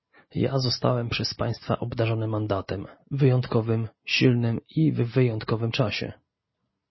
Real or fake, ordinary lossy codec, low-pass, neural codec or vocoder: real; MP3, 24 kbps; 7.2 kHz; none